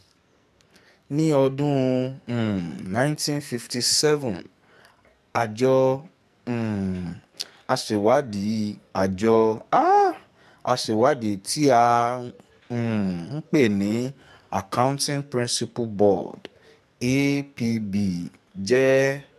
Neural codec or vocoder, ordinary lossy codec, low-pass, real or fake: codec, 44.1 kHz, 2.6 kbps, SNAC; MP3, 96 kbps; 14.4 kHz; fake